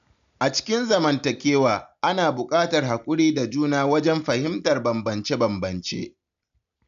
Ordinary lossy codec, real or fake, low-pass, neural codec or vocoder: AAC, 96 kbps; real; 7.2 kHz; none